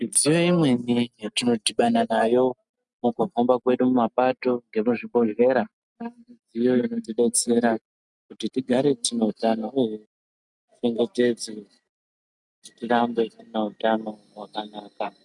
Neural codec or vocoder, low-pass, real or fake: none; 10.8 kHz; real